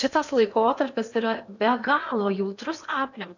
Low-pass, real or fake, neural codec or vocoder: 7.2 kHz; fake; codec, 16 kHz in and 24 kHz out, 0.8 kbps, FocalCodec, streaming, 65536 codes